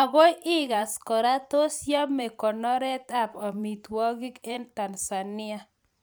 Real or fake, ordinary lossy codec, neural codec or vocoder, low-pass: fake; none; vocoder, 44.1 kHz, 128 mel bands every 512 samples, BigVGAN v2; none